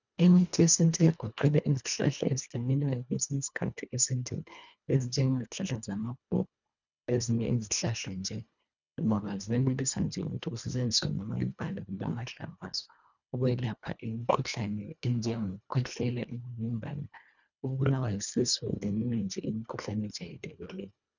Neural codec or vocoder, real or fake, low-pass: codec, 24 kHz, 1.5 kbps, HILCodec; fake; 7.2 kHz